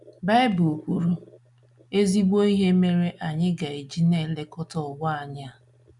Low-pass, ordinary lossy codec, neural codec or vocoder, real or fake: 10.8 kHz; none; none; real